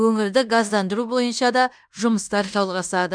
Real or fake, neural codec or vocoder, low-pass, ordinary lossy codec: fake; codec, 16 kHz in and 24 kHz out, 0.9 kbps, LongCat-Audio-Codec, fine tuned four codebook decoder; 9.9 kHz; none